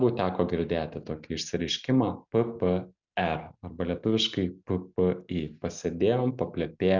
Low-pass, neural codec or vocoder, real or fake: 7.2 kHz; none; real